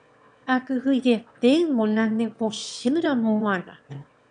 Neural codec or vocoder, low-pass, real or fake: autoencoder, 22.05 kHz, a latent of 192 numbers a frame, VITS, trained on one speaker; 9.9 kHz; fake